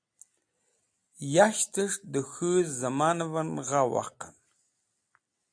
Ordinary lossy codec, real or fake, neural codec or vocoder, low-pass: AAC, 64 kbps; real; none; 9.9 kHz